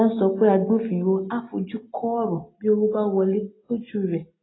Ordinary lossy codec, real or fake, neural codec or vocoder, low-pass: AAC, 16 kbps; real; none; 7.2 kHz